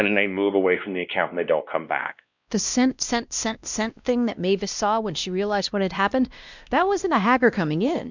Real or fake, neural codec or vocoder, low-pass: fake; codec, 16 kHz, 1 kbps, X-Codec, WavLM features, trained on Multilingual LibriSpeech; 7.2 kHz